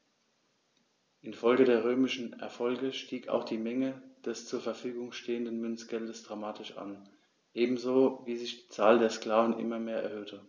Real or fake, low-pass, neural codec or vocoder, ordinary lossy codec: real; none; none; none